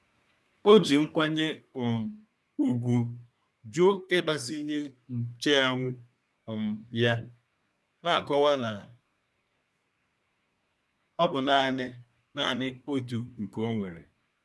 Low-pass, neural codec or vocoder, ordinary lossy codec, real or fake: none; codec, 24 kHz, 1 kbps, SNAC; none; fake